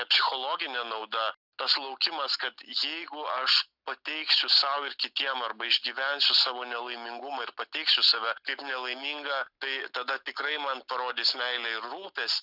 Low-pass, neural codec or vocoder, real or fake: 5.4 kHz; none; real